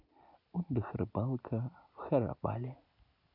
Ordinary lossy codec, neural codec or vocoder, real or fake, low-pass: none; none; real; 5.4 kHz